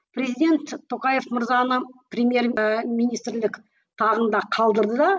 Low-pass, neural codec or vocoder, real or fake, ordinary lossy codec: none; none; real; none